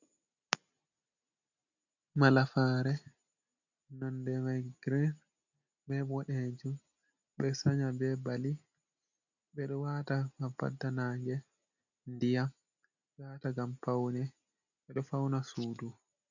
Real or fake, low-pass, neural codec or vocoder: real; 7.2 kHz; none